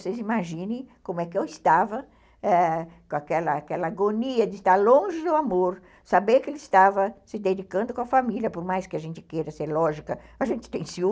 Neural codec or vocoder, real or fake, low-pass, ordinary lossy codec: none; real; none; none